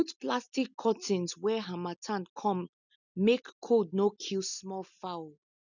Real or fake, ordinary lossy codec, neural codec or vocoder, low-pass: real; none; none; 7.2 kHz